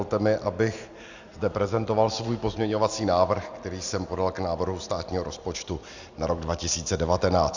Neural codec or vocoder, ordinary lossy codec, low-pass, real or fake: none; Opus, 64 kbps; 7.2 kHz; real